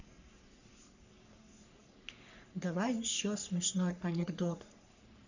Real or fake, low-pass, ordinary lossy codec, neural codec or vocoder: fake; 7.2 kHz; none; codec, 44.1 kHz, 3.4 kbps, Pupu-Codec